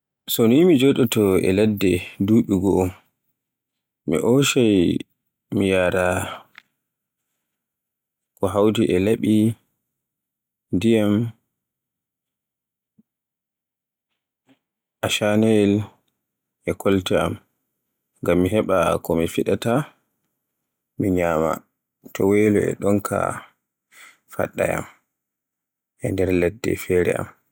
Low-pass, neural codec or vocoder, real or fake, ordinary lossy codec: 19.8 kHz; none; real; none